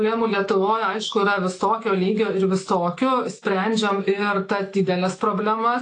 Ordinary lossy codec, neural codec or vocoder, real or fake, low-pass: AAC, 48 kbps; autoencoder, 48 kHz, 128 numbers a frame, DAC-VAE, trained on Japanese speech; fake; 10.8 kHz